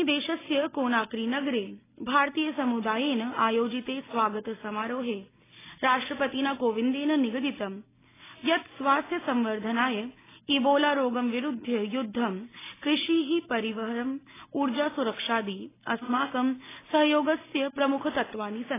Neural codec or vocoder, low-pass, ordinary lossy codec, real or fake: none; 3.6 kHz; AAC, 16 kbps; real